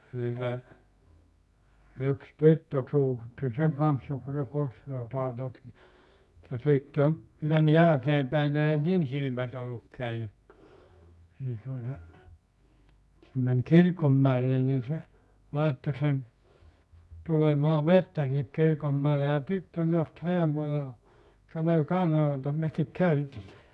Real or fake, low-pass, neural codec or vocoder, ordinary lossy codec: fake; none; codec, 24 kHz, 0.9 kbps, WavTokenizer, medium music audio release; none